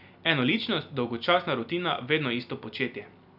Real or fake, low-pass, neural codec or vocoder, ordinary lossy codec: real; 5.4 kHz; none; none